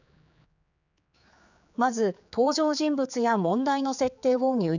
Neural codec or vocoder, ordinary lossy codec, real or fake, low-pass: codec, 16 kHz, 4 kbps, X-Codec, HuBERT features, trained on general audio; none; fake; 7.2 kHz